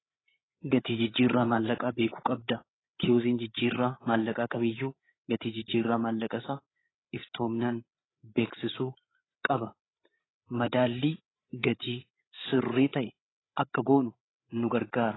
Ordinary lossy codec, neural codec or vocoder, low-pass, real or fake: AAC, 16 kbps; codec, 16 kHz, 8 kbps, FreqCodec, larger model; 7.2 kHz; fake